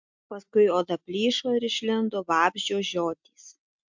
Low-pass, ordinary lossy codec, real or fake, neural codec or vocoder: 7.2 kHz; MP3, 64 kbps; real; none